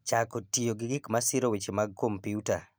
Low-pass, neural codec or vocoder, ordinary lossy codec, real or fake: none; none; none; real